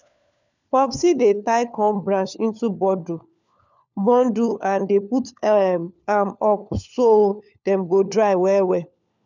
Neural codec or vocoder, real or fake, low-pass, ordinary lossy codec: codec, 16 kHz, 4 kbps, FunCodec, trained on LibriTTS, 50 frames a second; fake; 7.2 kHz; none